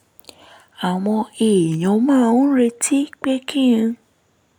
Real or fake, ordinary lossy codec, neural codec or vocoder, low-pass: real; none; none; 19.8 kHz